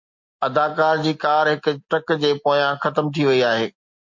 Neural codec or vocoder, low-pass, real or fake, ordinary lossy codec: none; 7.2 kHz; real; MP3, 48 kbps